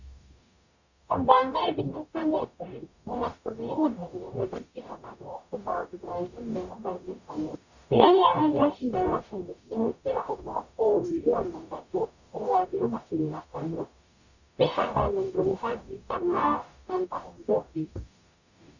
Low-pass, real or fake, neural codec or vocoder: 7.2 kHz; fake; codec, 44.1 kHz, 0.9 kbps, DAC